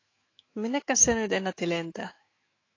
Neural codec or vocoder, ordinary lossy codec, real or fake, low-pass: autoencoder, 48 kHz, 128 numbers a frame, DAC-VAE, trained on Japanese speech; AAC, 32 kbps; fake; 7.2 kHz